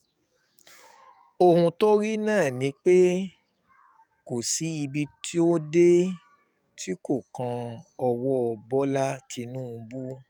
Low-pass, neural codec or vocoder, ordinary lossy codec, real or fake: 19.8 kHz; codec, 44.1 kHz, 7.8 kbps, DAC; none; fake